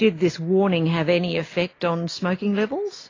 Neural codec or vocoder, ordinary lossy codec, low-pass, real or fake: none; AAC, 32 kbps; 7.2 kHz; real